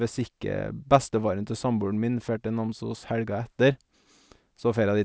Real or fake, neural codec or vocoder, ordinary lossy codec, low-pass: real; none; none; none